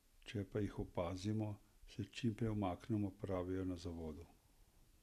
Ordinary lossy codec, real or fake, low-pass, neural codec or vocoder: none; real; 14.4 kHz; none